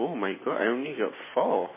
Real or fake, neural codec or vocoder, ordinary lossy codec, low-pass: real; none; MP3, 16 kbps; 3.6 kHz